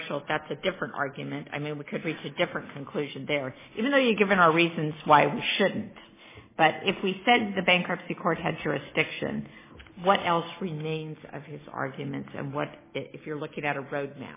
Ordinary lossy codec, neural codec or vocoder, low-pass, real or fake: MP3, 16 kbps; none; 3.6 kHz; real